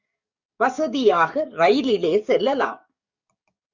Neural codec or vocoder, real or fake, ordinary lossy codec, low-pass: codec, 44.1 kHz, 7.8 kbps, DAC; fake; Opus, 64 kbps; 7.2 kHz